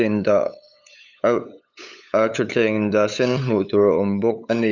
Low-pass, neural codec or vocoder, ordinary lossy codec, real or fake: 7.2 kHz; codec, 16 kHz, 8 kbps, FunCodec, trained on LibriTTS, 25 frames a second; none; fake